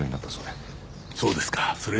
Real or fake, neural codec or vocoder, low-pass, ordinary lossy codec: real; none; none; none